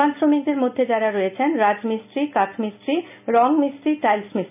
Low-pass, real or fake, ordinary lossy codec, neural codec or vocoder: 3.6 kHz; real; none; none